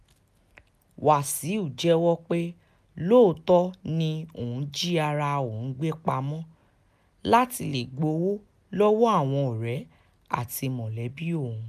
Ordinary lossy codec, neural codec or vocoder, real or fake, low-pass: none; none; real; 14.4 kHz